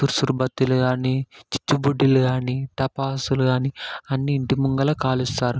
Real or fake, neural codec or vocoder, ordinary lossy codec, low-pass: real; none; none; none